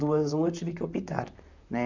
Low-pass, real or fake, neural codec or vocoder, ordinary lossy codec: 7.2 kHz; fake; vocoder, 44.1 kHz, 128 mel bands, Pupu-Vocoder; none